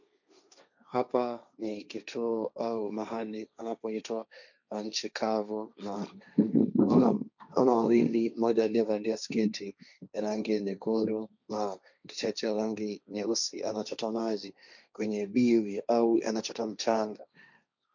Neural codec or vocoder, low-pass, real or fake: codec, 16 kHz, 1.1 kbps, Voila-Tokenizer; 7.2 kHz; fake